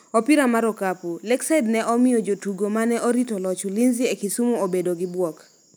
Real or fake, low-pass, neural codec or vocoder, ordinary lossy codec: real; none; none; none